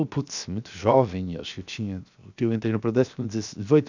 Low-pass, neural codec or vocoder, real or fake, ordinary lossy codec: 7.2 kHz; codec, 16 kHz, 0.7 kbps, FocalCodec; fake; none